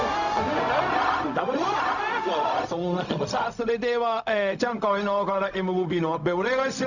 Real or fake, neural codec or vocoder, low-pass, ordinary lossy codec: fake; codec, 16 kHz, 0.4 kbps, LongCat-Audio-Codec; 7.2 kHz; none